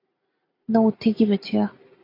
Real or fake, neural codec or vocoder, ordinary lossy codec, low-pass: real; none; AAC, 24 kbps; 5.4 kHz